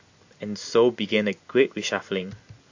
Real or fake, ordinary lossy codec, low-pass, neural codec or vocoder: real; AAC, 48 kbps; 7.2 kHz; none